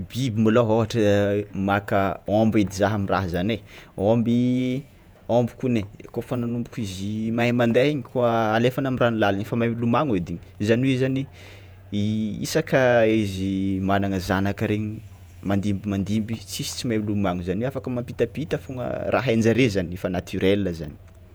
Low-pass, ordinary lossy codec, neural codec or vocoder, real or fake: none; none; vocoder, 48 kHz, 128 mel bands, Vocos; fake